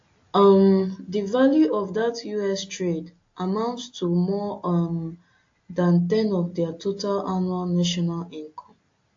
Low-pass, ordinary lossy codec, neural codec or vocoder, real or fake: 7.2 kHz; AAC, 48 kbps; none; real